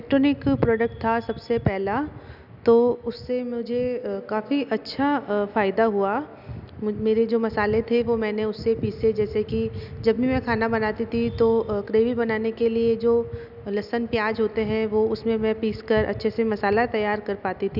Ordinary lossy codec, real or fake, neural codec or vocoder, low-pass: none; real; none; 5.4 kHz